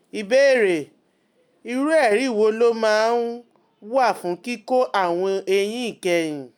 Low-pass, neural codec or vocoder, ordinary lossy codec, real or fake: 19.8 kHz; none; none; real